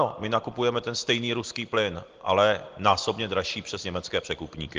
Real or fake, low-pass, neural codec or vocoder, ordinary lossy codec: real; 7.2 kHz; none; Opus, 16 kbps